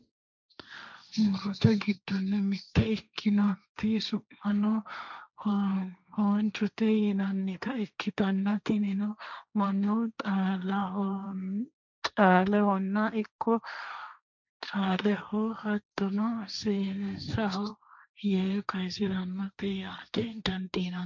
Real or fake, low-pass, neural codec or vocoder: fake; 7.2 kHz; codec, 16 kHz, 1.1 kbps, Voila-Tokenizer